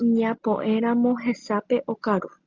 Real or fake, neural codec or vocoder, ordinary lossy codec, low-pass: real; none; Opus, 24 kbps; 7.2 kHz